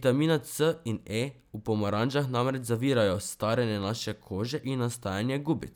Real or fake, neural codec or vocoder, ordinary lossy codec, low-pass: real; none; none; none